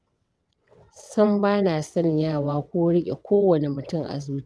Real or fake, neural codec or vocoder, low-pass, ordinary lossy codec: fake; vocoder, 22.05 kHz, 80 mel bands, WaveNeXt; none; none